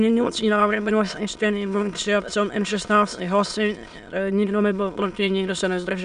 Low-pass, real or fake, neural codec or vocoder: 9.9 kHz; fake; autoencoder, 22.05 kHz, a latent of 192 numbers a frame, VITS, trained on many speakers